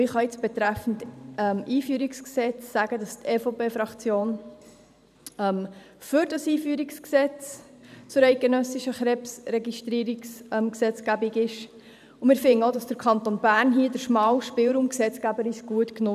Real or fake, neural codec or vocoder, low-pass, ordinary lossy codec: fake; vocoder, 44.1 kHz, 128 mel bands every 512 samples, BigVGAN v2; 14.4 kHz; none